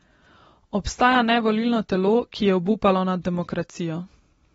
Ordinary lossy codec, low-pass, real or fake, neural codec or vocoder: AAC, 24 kbps; 19.8 kHz; real; none